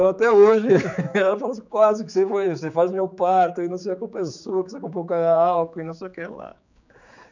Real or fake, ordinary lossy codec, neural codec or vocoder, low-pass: fake; none; codec, 16 kHz, 4 kbps, X-Codec, HuBERT features, trained on general audio; 7.2 kHz